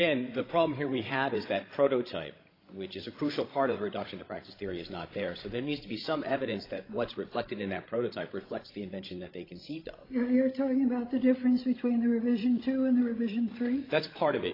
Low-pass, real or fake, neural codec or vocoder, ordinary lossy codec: 5.4 kHz; fake; codec, 16 kHz, 16 kbps, FreqCodec, larger model; AAC, 24 kbps